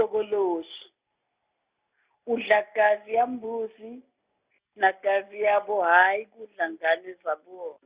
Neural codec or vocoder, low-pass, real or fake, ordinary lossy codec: none; 3.6 kHz; real; Opus, 64 kbps